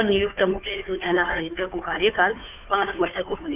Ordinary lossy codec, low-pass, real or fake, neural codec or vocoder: none; 3.6 kHz; fake; codec, 16 kHz, 2 kbps, FunCodec, trained on Chinese and English, 25 frames a second